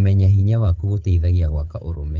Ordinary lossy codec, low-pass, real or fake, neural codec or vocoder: Opus, 24 kbps; 7.2 kHz; fake; codec, 16 kHz, 16 kbps, FreqCodec, smaller model